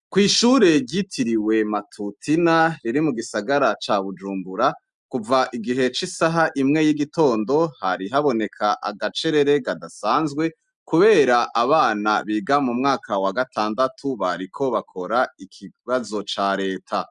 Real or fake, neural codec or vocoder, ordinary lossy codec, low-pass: real; none; MP3, 96 kbps; 10.8 kHz